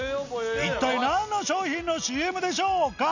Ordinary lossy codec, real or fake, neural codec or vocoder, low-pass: none; real; none; 7.2 kHz